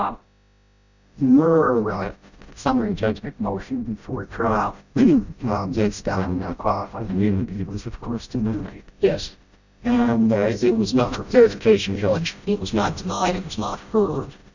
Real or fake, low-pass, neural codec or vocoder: fake; 7.2 kHz; codec, 16 kHz, 0.5 kbps, FreqCodec, smaller model